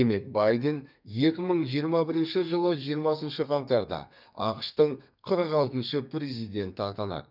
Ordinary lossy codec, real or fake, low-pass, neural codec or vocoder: none; fake; 5.4 kHz; codec, 32 kHz, 1.9 kbps, SNAC